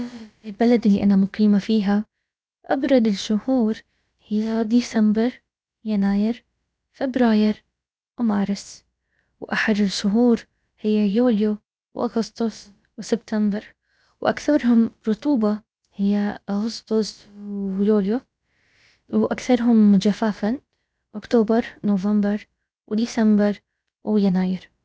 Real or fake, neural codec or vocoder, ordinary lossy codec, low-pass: fake; codec, 16 kHz, about 1 kbps, DyCAST, with the encoder's durations; none; none